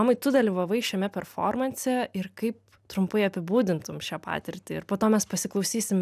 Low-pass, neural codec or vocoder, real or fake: 14.4 kHz; none; real